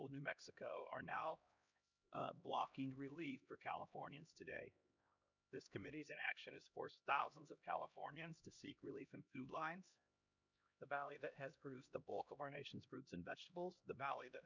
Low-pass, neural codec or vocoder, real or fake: 7.2 kHz; codec, 16 kHz, 1 kbps, X-Codec, HuBERT features, trained on LibriSpeech; fake